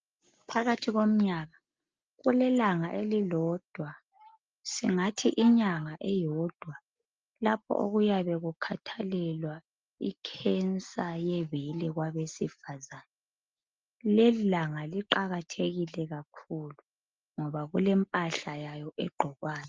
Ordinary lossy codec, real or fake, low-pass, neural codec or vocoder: Opus, 24 kbps; real; 7.2 kHz; none